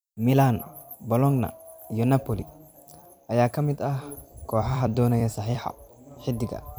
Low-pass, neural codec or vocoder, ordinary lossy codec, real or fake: none; vocoder, 44.1 kHz, 128 mel bands every 256 samples, BigVGAN v2; none; fake